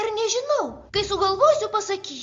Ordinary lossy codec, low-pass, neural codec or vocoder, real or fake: Opus, 32 kbps; 7.2 kHz; none; real